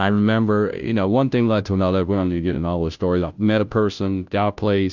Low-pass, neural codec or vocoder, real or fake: 7.2 kHz; codec, 16 kHz, 0.5 kbps, FunCodec, trained on Chinese and English, 25 frames a second; fake